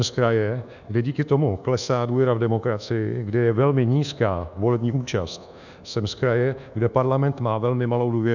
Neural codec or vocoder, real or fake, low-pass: codec, 24 kHz, 1.2 kbps, DualCodec; fake; 7.2 kHz